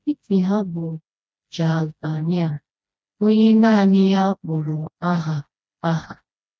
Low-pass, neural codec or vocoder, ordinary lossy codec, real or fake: none; codec, 16 kHz, 1 kbps, FreqCodec, smaller model; none; fake